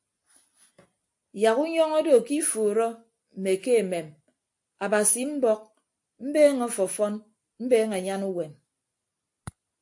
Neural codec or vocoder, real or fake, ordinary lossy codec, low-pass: none; real; AAC, 64 kbps; 10.8 kHz